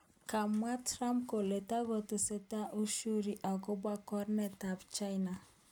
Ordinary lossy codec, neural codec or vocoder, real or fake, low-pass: none; none; real; 19.8 kHz